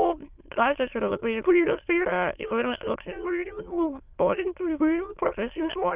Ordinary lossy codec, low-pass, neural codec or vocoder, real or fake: Opus, 24 kbps; 3.6 kHz; autoencoder, 22.05 kHz, a latent of 192 numbers a frame, VITS, trained on many speakers; fake